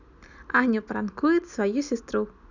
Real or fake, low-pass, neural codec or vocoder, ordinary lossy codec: real; 7.2 kHz; none; none